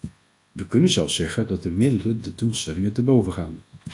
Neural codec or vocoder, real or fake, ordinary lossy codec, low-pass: codec, 24 kHz, 0.9 kbps, WavTokenizer, large speech release; fake; AAC, 48 kbps; 10.8 kHz